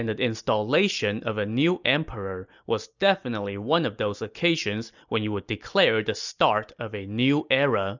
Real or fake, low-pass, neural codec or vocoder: real; 7.2 kHz; none